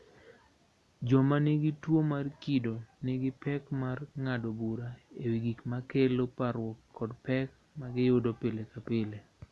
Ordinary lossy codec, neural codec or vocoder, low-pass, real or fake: none; none; none; real